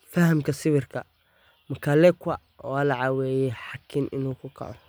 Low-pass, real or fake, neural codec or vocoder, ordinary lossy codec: none; real; none; none